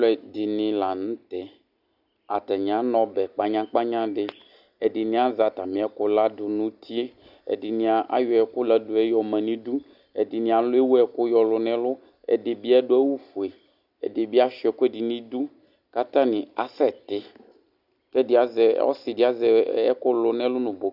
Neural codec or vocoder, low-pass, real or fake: none; 5.4 kHz; real